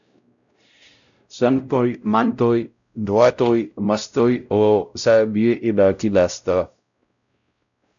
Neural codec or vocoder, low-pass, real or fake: codec, 16 kHz, 0.5 kbps, X-Codec, WavLM features, trained on Multilingual LibriSpeech; 7.2 kHz; fake